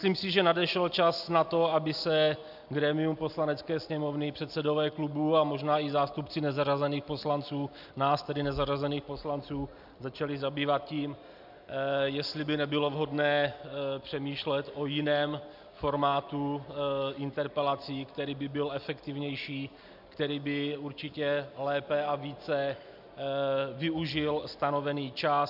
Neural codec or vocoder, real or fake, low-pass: none; real; 5.4 kHz